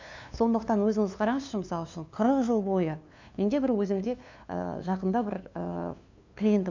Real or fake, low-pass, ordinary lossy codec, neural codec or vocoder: fake; 7.2 kHz; MP3, 64 kbps; codec, 16 kHz, 2 kbps, FunCodec, trained on LibriTTS, 25 frames a second